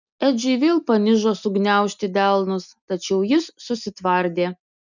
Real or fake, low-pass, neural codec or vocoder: real; 7.2 kHz; none